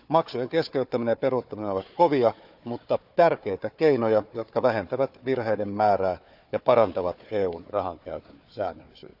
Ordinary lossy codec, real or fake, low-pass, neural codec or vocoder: none; fake; 5.4 kHz; codec, 16 kHz, 4 kbps, FunCodec, trained on Chinese and English, 50 frames a second